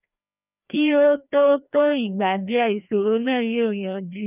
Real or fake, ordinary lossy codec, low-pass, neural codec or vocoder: fake; none; 3.6 kHz; codec, 16 kHz, 1 kbps, FreqCodec, larger model